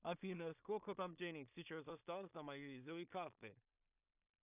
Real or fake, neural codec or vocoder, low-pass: fake; codec, 16 kHz in and 24 kHz out, 0.4 kbps, LongCat-Audio-Codec, two codebook decoder; 3.6 kHz